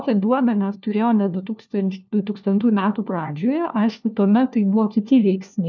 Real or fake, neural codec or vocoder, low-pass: fake; codec, 16 kHz, 1 kbps, FunCodec, trained on LibriTTS, 50 frames a second; 7.2 kHz